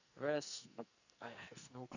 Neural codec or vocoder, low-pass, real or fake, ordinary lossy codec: codec, 44.1 kHz, 2.6 kbps, SNAC; 7.2 kHz; fake; none